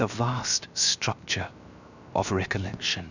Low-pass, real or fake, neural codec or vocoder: 7.2 kHz; fake; codec, 16 kHz, 0.7 kbps, FocalCodec